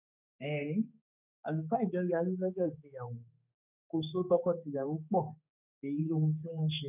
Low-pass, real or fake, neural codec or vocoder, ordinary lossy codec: 3.6 kHz; fake; codec, 16 kHz, 4 kbps, X-Codec, HuBERT features, trained on general audio; none